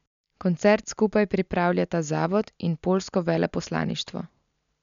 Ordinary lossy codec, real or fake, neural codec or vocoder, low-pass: MP3, 96 kbps; real; none; 7.2 kHz